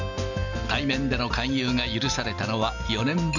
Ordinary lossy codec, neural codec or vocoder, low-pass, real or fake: none; none; 7.2 kHz; real